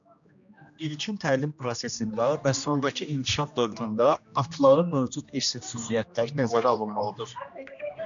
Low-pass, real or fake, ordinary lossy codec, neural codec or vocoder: 7.2 kHz; fake; MP3, 96 kbps; codec, 16 kHz, 1 kbps, X-Codec, HuBERT features, trained on general audio